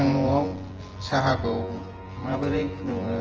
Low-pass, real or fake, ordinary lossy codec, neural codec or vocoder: 7.2 kHz; fake; Opus, 16 kbps; vocoder, 24 kHz, 100 mel bands, Vocos